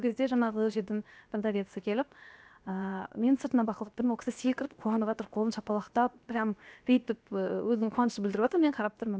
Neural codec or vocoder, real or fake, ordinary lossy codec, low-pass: codec, 16 kHz, 0.7 kbps, FocalCodec; fake; none; none